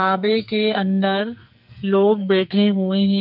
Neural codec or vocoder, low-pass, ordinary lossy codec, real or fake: codec, 44.1 kHz, 2.6 kbps, SNAC; 5.4 kHz; none; fake